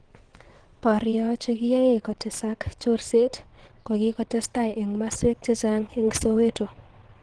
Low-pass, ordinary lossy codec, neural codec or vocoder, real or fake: 9.9 kHz; Opus, 16 kbps; vocoder, 22.05 kHz, 80 mel bands, WaveNeXt; fake